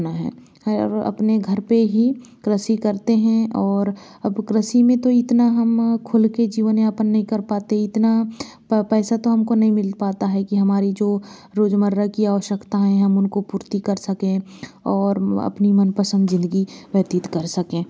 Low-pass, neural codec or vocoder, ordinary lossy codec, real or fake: none; none; none; real